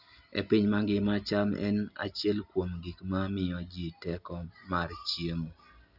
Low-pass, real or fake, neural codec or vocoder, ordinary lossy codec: 5.4 kHz; real; none; none